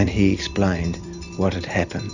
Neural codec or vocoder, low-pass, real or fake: vocoder, 44.1 kHz, 128 mel bands every 256 samples, BigVGAN v2; 7.2 kHz; fake